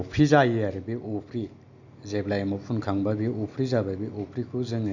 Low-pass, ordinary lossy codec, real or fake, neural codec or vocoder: 7.2 kHz; none; real; none